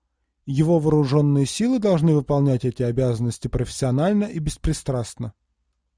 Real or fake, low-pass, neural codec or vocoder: real; 9.9 kHz; none